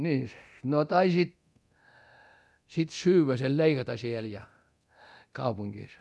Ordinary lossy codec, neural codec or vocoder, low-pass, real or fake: none; codec, 24 kHz, 0.9 kbps, DualCodec; none; fake